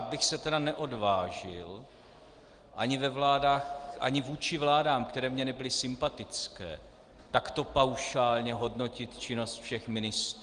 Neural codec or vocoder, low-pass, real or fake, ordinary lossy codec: none; 9.9 kHz; real; Opus, 24 kbps